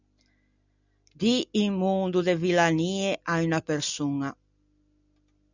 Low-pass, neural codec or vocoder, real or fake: 7.2 kHz; none; real